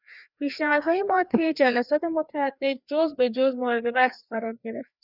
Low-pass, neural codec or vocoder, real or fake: 5.4 kHz; codec, 16 kHz, 2 kbps, FreqCodec, larger model; fake